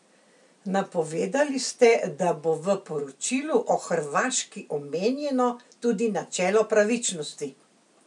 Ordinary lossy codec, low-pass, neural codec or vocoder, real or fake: none; 10.8 kHz; none; real